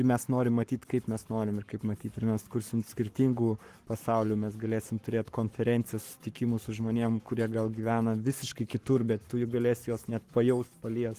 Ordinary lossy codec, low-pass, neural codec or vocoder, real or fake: Opus, 24 kbps; 14.4 kHz; codec, 44.1 kHz, 7.8 kbps, Pupu-Codec; fake